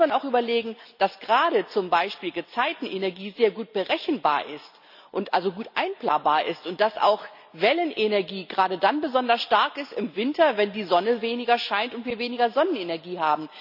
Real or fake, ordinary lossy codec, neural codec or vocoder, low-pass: real; none; none; 5.4 kHz